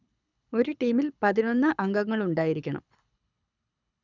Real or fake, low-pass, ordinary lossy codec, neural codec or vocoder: fake; 7.2 kHz; none; codec, 24 kHz, 6 kbps, HILCodec